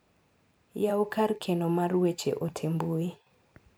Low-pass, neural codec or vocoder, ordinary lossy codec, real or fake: none; vocoder, 44.1 kHz, 128 mel bands every 512 samples, BigVGAN v2; none; fake